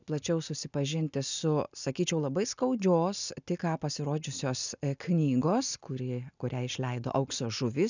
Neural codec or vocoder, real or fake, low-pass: none; real; 7.2 kHz